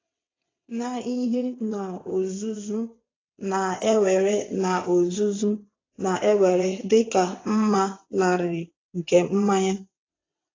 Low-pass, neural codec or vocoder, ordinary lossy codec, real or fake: 7.2 kHz; vocoder, 22.05 kHz, 80 mel bands, WaveNeXt; AAC, 32 kbps; fake